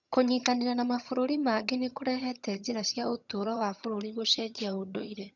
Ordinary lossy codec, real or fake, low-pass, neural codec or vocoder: none; fake; 7.2 kHz; vocoder, 22.05 kHz, 80 mel bands, HiFi-GAN